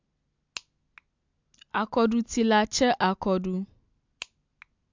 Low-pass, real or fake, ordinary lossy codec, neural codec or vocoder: 7.2 kHz; real; MP3, 64 kbps; none